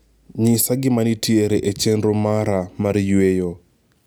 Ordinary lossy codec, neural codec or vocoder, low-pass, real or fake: none; none; none; real